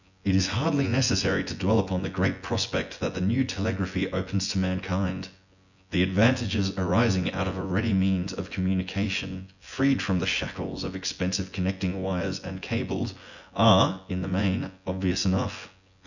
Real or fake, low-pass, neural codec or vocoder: fake; 7.2 kHz; vocoder, 24 kHz, 100 mel bands, Vocos